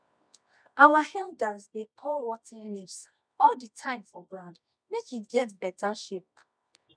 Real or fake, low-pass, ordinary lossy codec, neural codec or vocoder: fake; 9.9 kHz; none; codec, 24 kHz, 0.9 kbps, WavTokenizer, medium music audio release